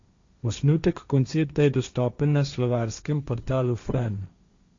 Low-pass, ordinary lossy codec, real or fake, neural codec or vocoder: 7.2 kHz; Opus, 64 kbps; fake; codec, 16 kHz, 1.1 kbps, Voila-Tokenizer